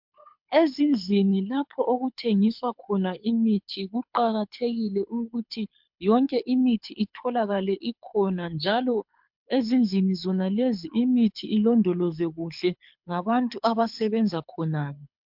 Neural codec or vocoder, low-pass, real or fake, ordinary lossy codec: codec, 24 kHz, 6 kbps, HILCodec; 5.4 kHz; fake; MP3, 48 kbps